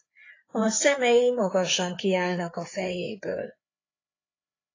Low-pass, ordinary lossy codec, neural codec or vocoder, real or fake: 7.2 kHz; AAC, 32 kbps; codec, 16 kHz, 4 kbps, FreqCodec, larger model; fake